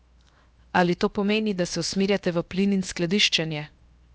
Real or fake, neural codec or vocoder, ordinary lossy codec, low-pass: fake; codec, 16 kHz, 0.7 kbps, FocalCodec; none; none